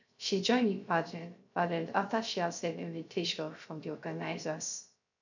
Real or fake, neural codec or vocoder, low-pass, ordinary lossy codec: fake; codec, 16 kHz, 0.3 kbps, FocalCodec; 7.2 kHz; none